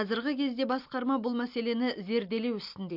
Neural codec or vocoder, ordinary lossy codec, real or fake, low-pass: none; MP3, 48 kbps; real; 5.4 kHz